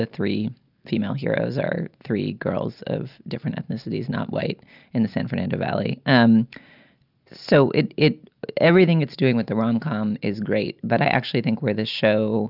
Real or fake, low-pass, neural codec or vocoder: real; 5.4 kHz; none